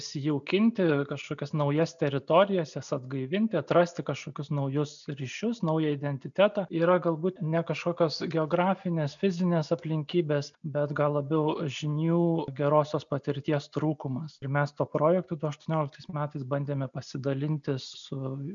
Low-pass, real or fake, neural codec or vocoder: 7.2 kHz; real; none